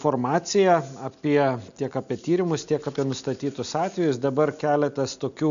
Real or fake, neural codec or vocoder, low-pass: real; none; 7.2 kHz